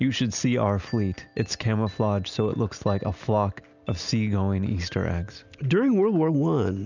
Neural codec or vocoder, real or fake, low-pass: none; real; 7.2 kHz